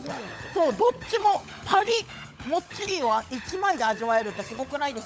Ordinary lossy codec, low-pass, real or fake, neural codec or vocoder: none; none; fake; codec, 16 kHz, 16 kbps, FunCodec, trained on LibriTTS, 50 frames a second